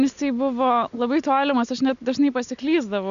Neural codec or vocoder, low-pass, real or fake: none; 7.2 kHz; real